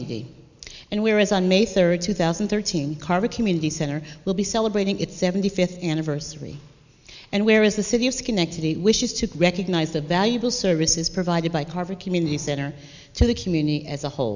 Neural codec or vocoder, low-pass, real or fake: none; 7.2 kHz; real